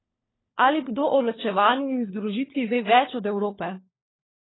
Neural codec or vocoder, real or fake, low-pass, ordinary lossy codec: codec, 16 kHz, 4 kbps, FunCodec, trained on LibriTTS, 50 frames a second; fake; 7.2 kHz; AAC, 16 kbps